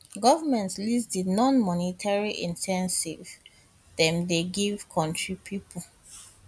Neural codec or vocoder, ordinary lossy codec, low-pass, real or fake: none; none; none; real